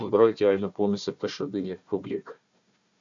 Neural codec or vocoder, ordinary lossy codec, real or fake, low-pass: codec, 16 kHz, 1 kbps, FunCodec, trained on Chinese and English, 50 frames a second; MP3, 64 kbps; fake; 7.2 kHz